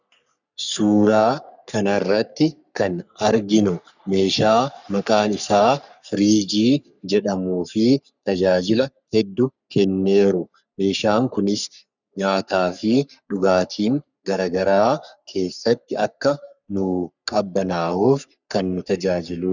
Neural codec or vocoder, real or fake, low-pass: codec, 44.1 kHz, 3.4 kbps, Pupu-Codec; fake; 7.2 kHz